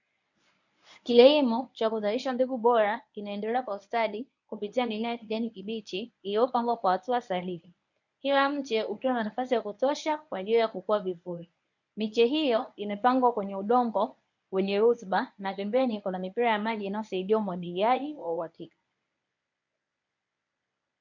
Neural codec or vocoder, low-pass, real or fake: codec, 24 kHz, 0.9 kbps, WavTokenizer, medium speech release version 1; 7.2 kHz; fake